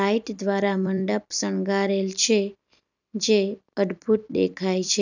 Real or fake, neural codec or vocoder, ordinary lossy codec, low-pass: fake; vocoder, 44.1 kHz, 128 mel bands every 256 samples, BigVGAN v2; none; 7.2 kHz